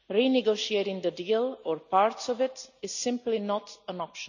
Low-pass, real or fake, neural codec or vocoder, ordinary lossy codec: 7.2 kHz; real; none; none